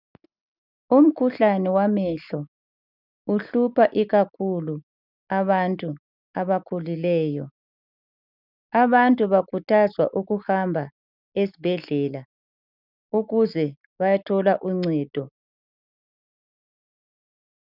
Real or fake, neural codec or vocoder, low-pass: real; none; 5.4 kHz